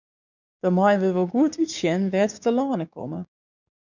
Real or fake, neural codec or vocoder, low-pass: fake; codec, 16 kHz, 6 kbps, DAC; 7.2 kHz